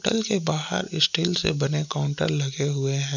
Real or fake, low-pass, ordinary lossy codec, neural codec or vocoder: real; 7.2 kHz; none; none